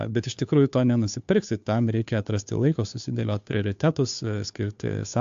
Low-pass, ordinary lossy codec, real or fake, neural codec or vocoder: 7.2 kHz; AAC, 64 kbps; fake; codec, 16 kHz, 2 kbps, FunCodec, trained on Chinese and English, 25 frames a second